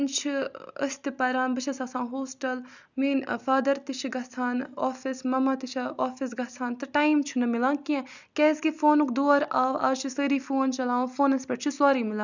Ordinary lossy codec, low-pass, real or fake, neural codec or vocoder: none; 7.2 kHz; real; none